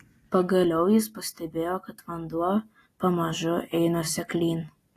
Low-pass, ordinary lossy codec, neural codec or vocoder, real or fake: 14.4 kHz; AAC, 48 kbps; none; real